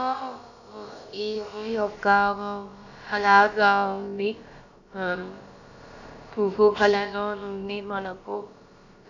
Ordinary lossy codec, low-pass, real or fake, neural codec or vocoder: none; 7.2 kHz; fake; codec, 16 kHz, about 1 kbps, DyCAST, with the encoder's durations